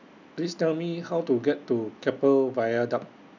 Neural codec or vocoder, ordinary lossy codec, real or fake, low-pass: none; none; real; 7.2 kHz